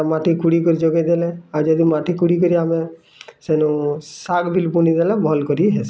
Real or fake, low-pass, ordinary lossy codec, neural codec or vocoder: real; none; none; none